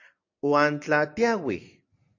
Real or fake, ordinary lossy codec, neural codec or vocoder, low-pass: real; AAC, 48 kbps; none; 7.2 kHz